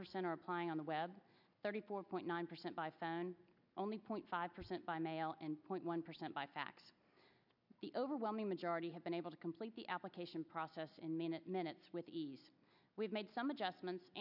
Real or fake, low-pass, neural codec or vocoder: real; 5.4 kHz; none